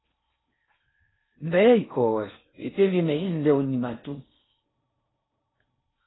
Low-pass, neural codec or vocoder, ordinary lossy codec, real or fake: 7.2 kHz; codec, 16 kHz in and 24 kHz out, 0.6 kbps, FocalCodec, streaming, 4096 codes; AAC, 16 kbps; fake